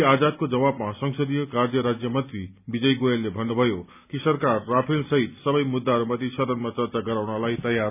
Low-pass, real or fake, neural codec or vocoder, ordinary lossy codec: 3.6 kHz; real; none; none